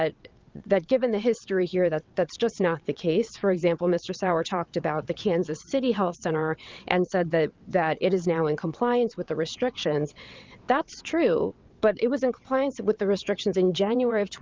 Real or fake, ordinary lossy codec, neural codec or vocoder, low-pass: fake; Opus, 32 kbps; vocoder, 22.05 kHz, 80 mel bands, WaveNeXt; 7.2 kHz